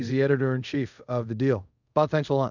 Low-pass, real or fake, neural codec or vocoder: 7.2 kHz; fake; codec, 24 kHz, 0.5 kbps, DualCodec